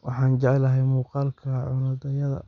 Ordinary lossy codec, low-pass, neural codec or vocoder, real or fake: Opus, 64 kbps; 7.2 kHz; none; real